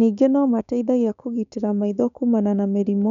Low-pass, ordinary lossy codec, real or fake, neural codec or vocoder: 7.2 kHz; none; fake; codec, 16 kHz, 6 kbps, DAC